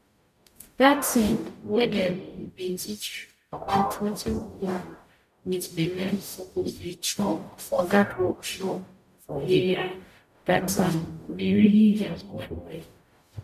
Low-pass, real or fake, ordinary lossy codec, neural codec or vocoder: 14.4 kHz; fake; none; codec, 44.1 kHz, 0.9 kbps, DAC